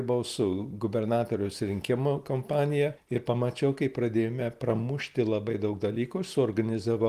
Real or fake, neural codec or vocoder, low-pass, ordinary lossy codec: real; none; 14.4 kHz; Opus, 24 kbps